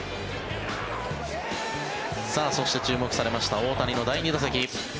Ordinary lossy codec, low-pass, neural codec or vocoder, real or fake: none; none; none; real